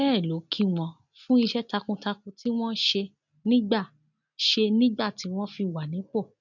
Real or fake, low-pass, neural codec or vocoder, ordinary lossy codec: real; 7.2 kHz; none; none